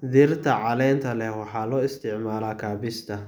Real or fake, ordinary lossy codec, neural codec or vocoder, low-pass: real; none; none; none